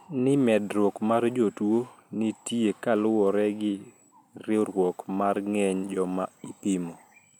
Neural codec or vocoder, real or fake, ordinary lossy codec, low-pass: none; real; none; 19.8 kHz